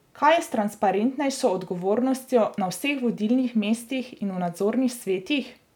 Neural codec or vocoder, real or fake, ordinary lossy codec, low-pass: none; real; none; 19.8 kHz